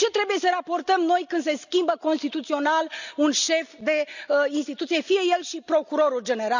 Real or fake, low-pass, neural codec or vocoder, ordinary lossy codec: real; 7.2 kHz; none; none